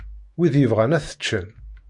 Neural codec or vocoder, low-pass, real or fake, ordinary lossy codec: autoencoder, 48 kHz, 128 numbers a frame, DAC-VAE, trained on Japanese speech; 10.8 kHz; fake; MP3, 48 kbps